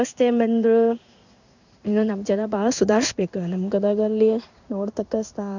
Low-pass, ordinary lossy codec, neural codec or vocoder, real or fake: 7.2 kHz; none; codec, 16 kHz in and 24 kHz out, 1 kbps, XY-Tokenizer; fake